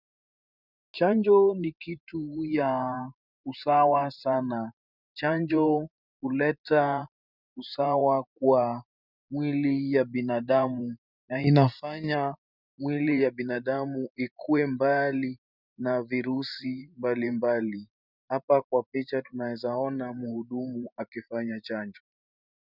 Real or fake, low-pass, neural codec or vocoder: fake; 5.4 kHz; vocoder, 44.1 kHz, 128 mel bands every 512 samples, BigVGAN v2